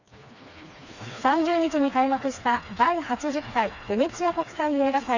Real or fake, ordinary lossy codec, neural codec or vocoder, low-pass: fake; none; codec, 16 kHz, 2 kbps, FreqCodec, smaller model; 7.2 kHz